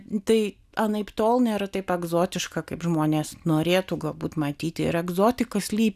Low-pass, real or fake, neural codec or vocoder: 14.4 kHz; real; none